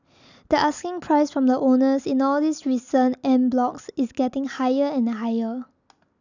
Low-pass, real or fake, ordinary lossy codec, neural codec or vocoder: 7.2 kHz; real; none; none